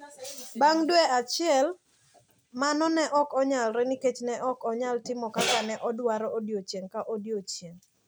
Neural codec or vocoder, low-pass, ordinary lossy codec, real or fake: none; none; none; real